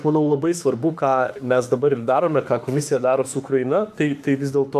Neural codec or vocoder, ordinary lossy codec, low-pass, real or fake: autoencoder, 48 kHz, 32 numbers a frame, DAC-VAE, trained on Japanese speech; AAC, 96 kbps; 14.4 kHz; fake